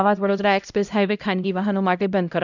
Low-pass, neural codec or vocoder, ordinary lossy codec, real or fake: 7.2 kHz; codec, 16 kHz, 0.5 kbps, X-Codec, HuBERT features, trained on LibriSpeech; none; fake